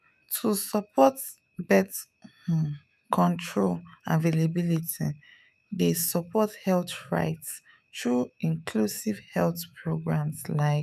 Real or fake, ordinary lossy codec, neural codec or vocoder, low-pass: fake; none; autoencoder, 48 kHz, 128 numbers a frame, DAC-VAE, trained on Japanese speech; 14.4 kHz